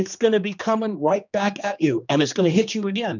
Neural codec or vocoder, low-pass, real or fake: codec, 16 kHz, 2 kbps, X-Codec, HuBERT features, trained on general audio; 7.2 kHz; fake